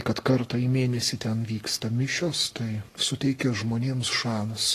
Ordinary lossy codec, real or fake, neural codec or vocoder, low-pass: AAC, 48 kbps; fake; codec, 44.1 kHz, 7.8 kbps, Pupu-Codec; 14.4 kHz